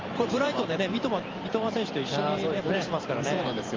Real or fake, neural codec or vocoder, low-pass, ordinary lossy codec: fake; vocoder, 44.1 kHz, 128 mel bands every 512 samples, BigVGAN v2; 7.2 kHz; Opus, 32 kbps